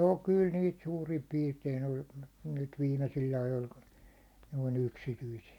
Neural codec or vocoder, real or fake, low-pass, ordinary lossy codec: none; real; 19.8 kHz; none